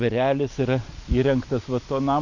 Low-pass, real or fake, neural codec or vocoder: 7.2 kHz; real; none